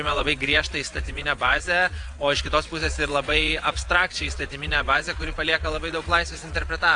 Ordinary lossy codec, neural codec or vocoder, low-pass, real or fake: AAC, 64 kbps; vocoder, 22.05 kHz, 80 mel bands, WaveNeXt; 9.9 kHz; fake